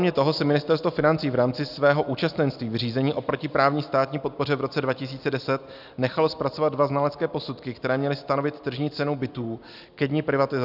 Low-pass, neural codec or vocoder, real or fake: 5.4 kHz; none; real